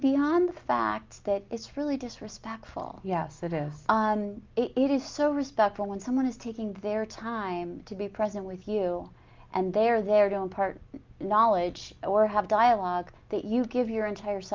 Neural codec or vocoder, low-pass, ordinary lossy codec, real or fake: none; 7.2 kHz; Opus, 24 kbps; real